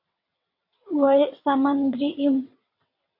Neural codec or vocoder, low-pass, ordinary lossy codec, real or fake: vocoder, 22.05 kHz, 80 mel bands, WaveNeXt; 5.4 kHz; MP3, 32 kbps; fake